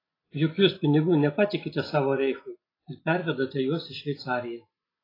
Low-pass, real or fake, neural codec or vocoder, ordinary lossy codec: 5.4 kHz; real; none; AAC, 24 kbps